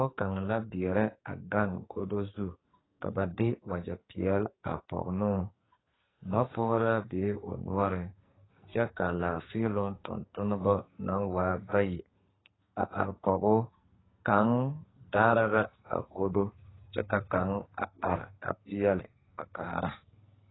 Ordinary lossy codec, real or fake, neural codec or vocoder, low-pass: AAC, 16 kbps; fake; codec, 44.1 kHz, 2.6 kbps, SNAC; 7.2 kHz